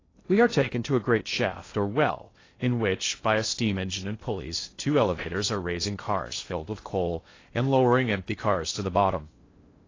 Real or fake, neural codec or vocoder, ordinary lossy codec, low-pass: fake; codec, 16 kHz in and 24 kHz out, 0.6 kbps, FocalCodec, streaming, 2048 codes; AAC, 32 kbps; 7.2 kHz